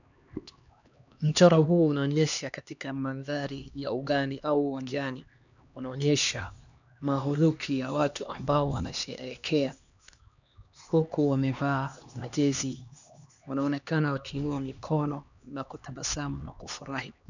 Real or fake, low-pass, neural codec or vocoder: fake; 7.2 kHz; codec, 16 kHz, 2 kbps, X-Codec, HuBERT features, trained on LibriSpeech